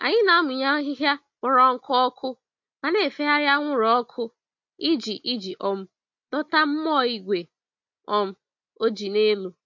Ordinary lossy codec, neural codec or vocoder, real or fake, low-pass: MP3, 48 kbps; none; real; 7.2 kHz